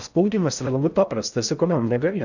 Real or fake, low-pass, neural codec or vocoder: fake; 7.2 kHz; codec, 16 kHz in and 24 kHz out, 0.6 kbps, FocalCodec, streaming, 4096 codes